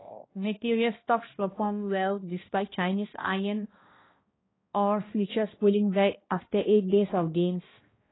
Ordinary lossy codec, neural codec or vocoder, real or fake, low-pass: AAC, 16 kbps; codec, 16 kHz, 1 kbps, X-Codec, HuBERT features, trained on balanced general audio; fake; 7.2 kHz